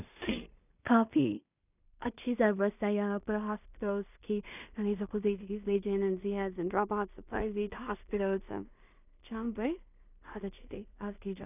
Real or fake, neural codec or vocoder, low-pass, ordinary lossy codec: fake; codec, 16 kHz in and 24 kHz out, 0.4 kbps, LongCat-Audio-Codec, two codebook decoder; 3.6 kHz; none